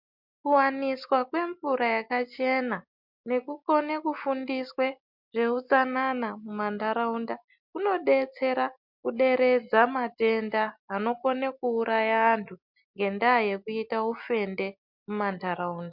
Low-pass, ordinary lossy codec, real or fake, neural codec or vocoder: 5.4 kHz; MP3, 48 kbps; real; none